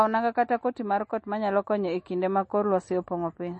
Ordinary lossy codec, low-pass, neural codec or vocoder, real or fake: MP3, 32 kbps; 10.8 kHz; none; real